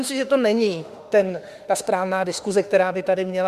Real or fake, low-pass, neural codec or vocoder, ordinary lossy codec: fake; 14.4 kHz; autoencoder, 48 kHz, 32 numbers a frame, DAC-VAE, trained on Japanese speech; Opus, 64 kbps